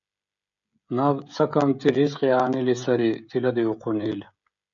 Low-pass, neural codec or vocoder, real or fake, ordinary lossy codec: 7.2 kHz; codec, 16 kHz, 16 kbps, FreqCodec, smaller model; fake; MP3, 64 kbps